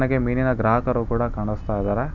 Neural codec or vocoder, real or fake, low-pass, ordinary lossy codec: none; real; 7.2 kHz; none